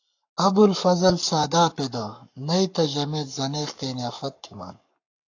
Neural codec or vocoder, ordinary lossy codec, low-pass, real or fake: codec, 44.1 kHz, 7.8 kbps, Pupu-Codec; AAC, 48 kbps; 7.2 kHz; fake